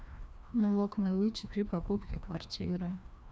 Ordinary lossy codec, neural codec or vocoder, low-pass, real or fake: none; codec, 16 kHz, 1 kbps, FreqCodec, larger model; none; fake